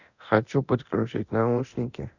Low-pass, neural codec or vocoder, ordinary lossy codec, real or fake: 7.2 kHz; codec, 24 kHz, 0.9 kbps, DualCodec; none; fake